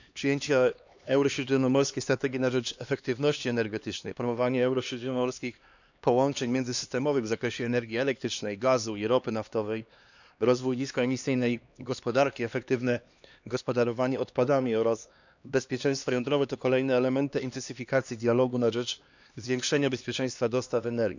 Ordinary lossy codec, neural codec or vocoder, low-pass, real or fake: none; codec, 16 kHz, 2 kbps, X-Codec, HuBERT features, trained on LibriSpeech; 7.2 kHz; fake